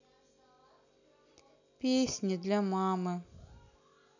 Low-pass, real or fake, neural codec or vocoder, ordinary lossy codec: 7.2 kHz; real; none; none